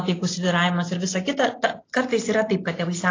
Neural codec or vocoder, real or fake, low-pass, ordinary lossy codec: none; real; 7.2 kHz; AAC, 32 kbps